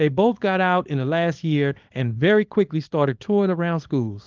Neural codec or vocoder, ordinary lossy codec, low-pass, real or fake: codec, 24 kHz, 0.9 kbps, WavTokenizer, small release; Opus, 32 kbps; 7.2 kHz; fake